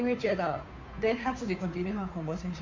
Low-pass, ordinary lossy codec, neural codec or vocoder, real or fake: 7.2 kHz; MP3, 64 kbps; codec, 16 kHz, 2 kbps, FunCodec, trained on Chinese and English, 25 frames a second; fake